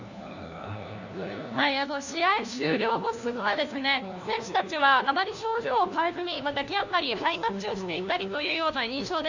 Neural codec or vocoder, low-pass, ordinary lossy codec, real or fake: codec, 16 kHz, 1 kbps, FunCodec, trained on LibriTTS, 50 frames a second; 7.2 kHz; none; fake